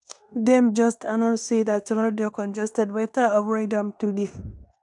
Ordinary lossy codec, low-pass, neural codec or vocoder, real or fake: none; 10.8 kHz; codec, 16 kHz in and 24 kHz out, 0.9 kbps, LongCat-Audio-Codec, fine tuned four codebook decoder; fake